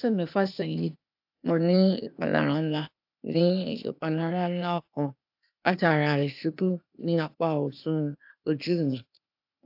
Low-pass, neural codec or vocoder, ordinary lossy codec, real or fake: 5.4 kHz; codec, 16 kHz, 0.8 kbps, ZipCodec; AAC, 48 kbps; fake